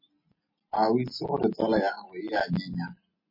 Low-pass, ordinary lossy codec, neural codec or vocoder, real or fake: 5.4 kHz; MP3, 24 kbps; none; real